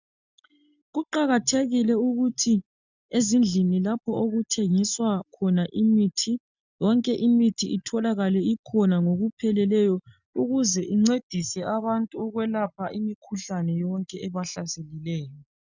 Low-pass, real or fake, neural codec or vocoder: 7.2 kHz; real; none